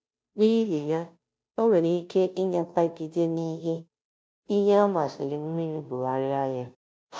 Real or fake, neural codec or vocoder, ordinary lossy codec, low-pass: fake; codec, 16 kHz, 0.5 kbps, FunCodec, trained on Chinese and English, 25 frames a second; none; none